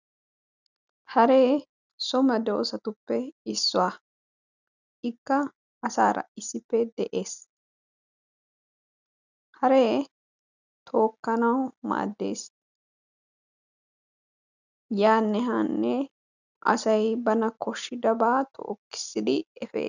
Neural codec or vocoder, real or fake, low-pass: none; real; 7.2 kHz